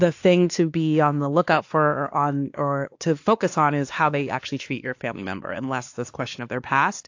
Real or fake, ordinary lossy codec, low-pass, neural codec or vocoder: fake; AAC, 48 kbps; 7.2 kHz; codec, 16 kHz, 2 kbps, X-Codec, WavLM features, trained on Multilingual LibriSpeech